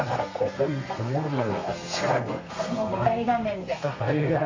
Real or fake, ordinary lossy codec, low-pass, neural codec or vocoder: fake; MP3, 32 kbps; 7.2 kHz; codec, 32 kHz, 1.9 kbps, SNAC